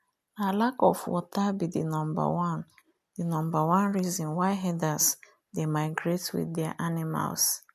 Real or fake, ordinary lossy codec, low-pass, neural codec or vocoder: real; none; 14.4 kHz; none